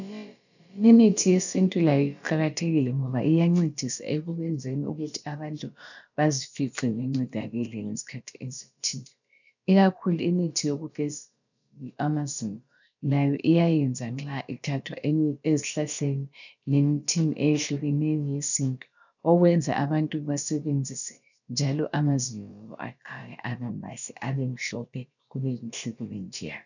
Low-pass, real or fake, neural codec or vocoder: 7.2 kHz; fake; codec, 16 kHz, about 1 kbps, DyCAST, with the encoder's durations